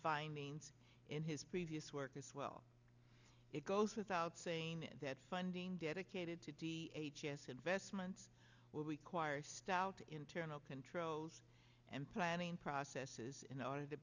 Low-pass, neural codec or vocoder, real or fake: 7.2 kHz; none; real